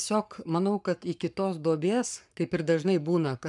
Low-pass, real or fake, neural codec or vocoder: 10.8 kHz; fake; codec, 44.1 kHz, 7.8 kbps, Pupu-Codec